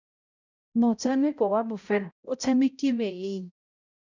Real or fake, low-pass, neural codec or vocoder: fake; 7.2 kHz; codec, 16 kHz, 0.5 kbps, X-Codec, HuBERT features, trained on balanced general audio